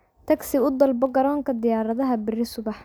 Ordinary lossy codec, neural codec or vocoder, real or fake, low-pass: none; none; real; none